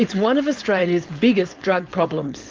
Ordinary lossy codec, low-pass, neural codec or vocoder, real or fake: Opus, 24 kbps; 7.2 kHz; codec, 16 kHz, 4.8 kbps, FACodec; fake